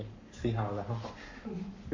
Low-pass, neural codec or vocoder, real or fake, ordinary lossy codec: 7.2 kHz; codec, 44.1 kHz, 7.8 kbps, Pupu-Codec; fake; MP3, 48 kbps